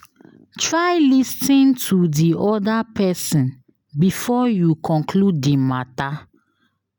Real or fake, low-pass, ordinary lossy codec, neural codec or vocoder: real; none; none; none